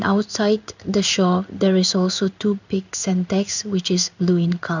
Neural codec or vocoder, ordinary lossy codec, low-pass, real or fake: codec, 16 kHz in and 24 kHz out, 1 kbps, XY-Tokenizer; none; 7.2 kHz; fake